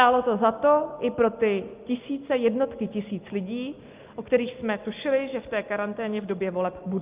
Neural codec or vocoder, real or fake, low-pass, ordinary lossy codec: none; real; 3.6 kHz; Opus, 24 kbps